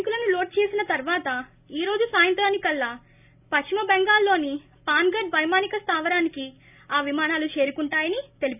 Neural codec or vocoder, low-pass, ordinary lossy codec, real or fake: none; 3.6 kHz; none; real